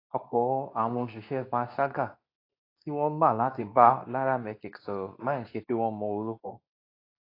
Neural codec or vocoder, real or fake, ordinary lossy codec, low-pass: codec, 24 kHz, 0.9 kbps, WavTokenizer, medium speech release version 2; fake; AAC, 32 kbps; 5.4 kHz